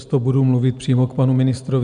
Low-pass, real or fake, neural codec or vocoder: 9.9 kHz; real; none